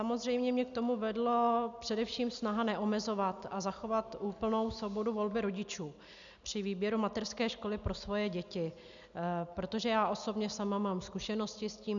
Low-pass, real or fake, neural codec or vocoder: 7.2 kHz; real; none